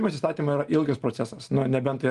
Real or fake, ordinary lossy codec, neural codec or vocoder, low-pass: real; Opus, 24 kbps; none; 10.8 kHz